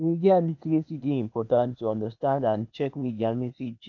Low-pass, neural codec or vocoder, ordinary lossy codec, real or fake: 7.2 kHz; codec, 16 kHz, 0.8 kbps, ZipCodec; none; fake